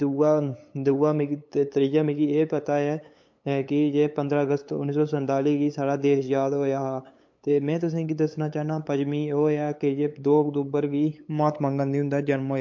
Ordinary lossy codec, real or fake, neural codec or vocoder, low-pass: MP3, 48 kbps; fake; codec, 16 kHz, 8 kbps, FunCodec, trained on LibriTTS, 25 frames a second; 7.2 kHz